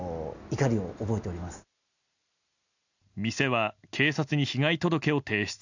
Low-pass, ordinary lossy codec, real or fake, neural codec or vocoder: 7.2 kHz; none; real; none